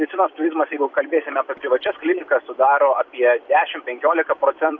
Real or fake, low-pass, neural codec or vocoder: fake; 7.2 kHz; vocoder, 44.1 kHz, 128 mel bands every 256 samples, BigVGAN v2